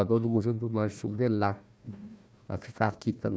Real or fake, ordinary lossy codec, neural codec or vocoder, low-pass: fake; none; codec, 16 kHz, 1 kbps, FunCodec, trained on Chinese and English, 50 frames a second; none